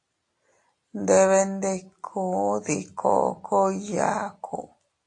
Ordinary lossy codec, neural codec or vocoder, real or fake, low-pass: AAC, 48 kbps; none; real; 10.8 kHz